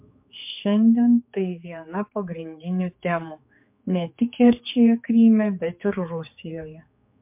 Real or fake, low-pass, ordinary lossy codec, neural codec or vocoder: fake; 3.6 kHz; MP3, 32 kbps; codec, 16 kHz, 4 kbps, X-Codec, HuBERT features, trained on general audio